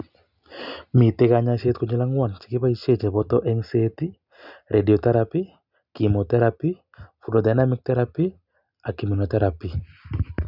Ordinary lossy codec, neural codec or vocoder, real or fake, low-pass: none; none; real; 5.4 kHz